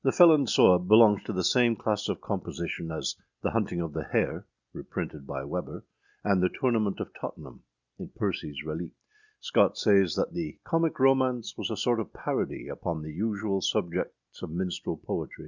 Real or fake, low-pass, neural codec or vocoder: real; 7.2 kHz; none